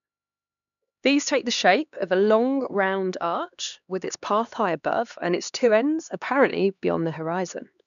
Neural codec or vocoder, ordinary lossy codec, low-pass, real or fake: codec, 16 kHz, 2 kbps, X-Codec, HuBERT features, trained on LibriSpeech; none; 7.2 kHz; fake